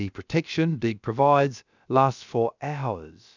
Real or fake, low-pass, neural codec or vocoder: fake; 7.2 kHz; codec, 16 kHz, about 1 kbps, DyCAST, with the encoder's durations